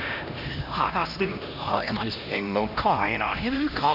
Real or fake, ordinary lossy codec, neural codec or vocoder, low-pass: fake; Opus, 64 kbps; codec, 16 kHz, 1 kbps, X-Codec, HuBERT features, trained on LibriSpeech; 5.4 kHz